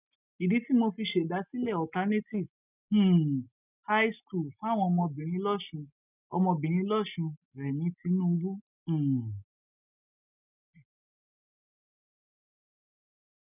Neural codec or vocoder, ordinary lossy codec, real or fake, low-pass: none; none; real; 3.6 kHz